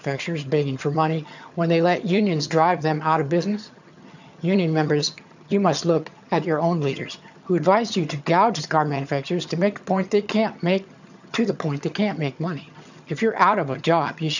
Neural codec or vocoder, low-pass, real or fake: vocoder, 22.05 kHz, 80 mel bands, HiFi-GAN; 7.2 kHz; fake